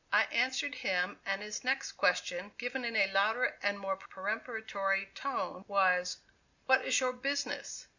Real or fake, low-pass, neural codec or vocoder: real; 7.2 kHz; none